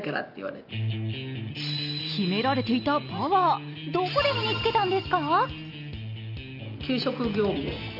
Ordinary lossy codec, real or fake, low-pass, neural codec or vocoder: none; real; 5.4 kHz; none